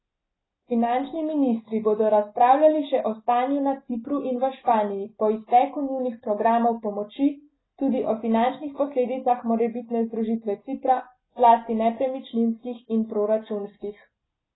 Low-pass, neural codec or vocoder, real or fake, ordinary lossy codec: 7.2 kHz; none; real; AAC, 16 kbps